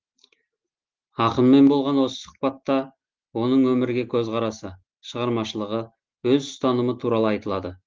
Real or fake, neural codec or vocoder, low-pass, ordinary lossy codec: real; none; 7.2 kHz; Opus, 16 kbps